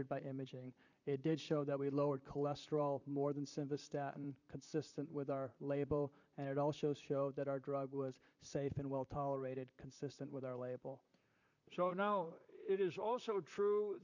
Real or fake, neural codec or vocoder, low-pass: fake; vocoder, 44.1 kHz, 128 mel bands, Pupu-Vocoder; 7.2 kHz